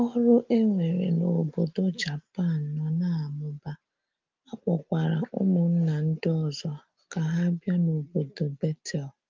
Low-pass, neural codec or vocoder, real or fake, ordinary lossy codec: 7.2 kHz; none; real; Opus, 24 kbps